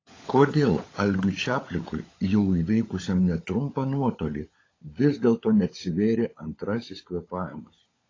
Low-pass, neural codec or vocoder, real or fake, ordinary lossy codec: 7.2 kHz; codec, 16 kHz, 16 kbps, FunCodec, trained on LibriTTS, 50 frames a second; fake; AAC, 32 kbps